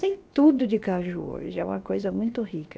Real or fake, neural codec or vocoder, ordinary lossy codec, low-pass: fake; codec, 16 kHz, about 1 kbps, DyCAST, with the encoder's durations; none; none